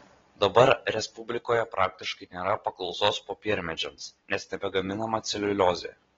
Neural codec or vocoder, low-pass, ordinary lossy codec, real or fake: none; 7.2 kHz; AAC, 24 kbps; real